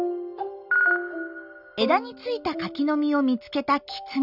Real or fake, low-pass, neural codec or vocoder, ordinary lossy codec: real; 5.4 kHz; none; none